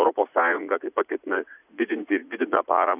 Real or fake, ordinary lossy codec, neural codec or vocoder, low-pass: fake; AAC, 32 kbps; vocoder, 44.1 kHz, 80 mel bands, Vocos; 3.6 kHz